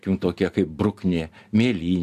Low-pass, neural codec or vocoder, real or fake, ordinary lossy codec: 14.4 kHz; none; real; AAC, 96 kbps